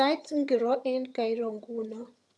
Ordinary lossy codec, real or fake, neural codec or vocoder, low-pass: none; fake; vocoder, 22.05 kHz, 80 mel bands, HiFi-GAN; none